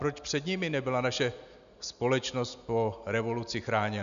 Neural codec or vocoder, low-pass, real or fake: none; 7.2 kHz; real